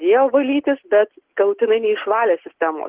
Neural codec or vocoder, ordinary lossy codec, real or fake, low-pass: none; Opus, 16 kbps; real; 3.6 kHz